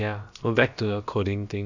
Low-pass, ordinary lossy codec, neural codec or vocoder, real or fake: 7.2 kHz; none; codec, 16 kHz, about 1 kbps, DyCAST, with the encoder's durations; fake